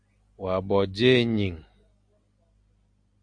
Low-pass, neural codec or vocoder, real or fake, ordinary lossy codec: 9.9 kHz; none; real; Opus, 64 kbps